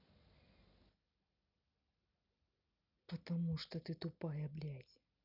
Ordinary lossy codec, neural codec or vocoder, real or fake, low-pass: none; none; real; 5.4 kHz